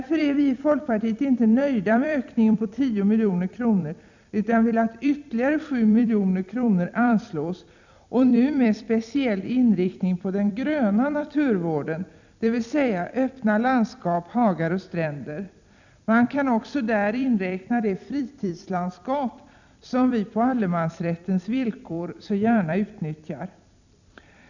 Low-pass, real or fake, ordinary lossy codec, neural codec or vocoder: 7.2 kHz; fake; none; vocoder, 44.1 kHz, 128 mel bands every 256 samples, BigVGAN v2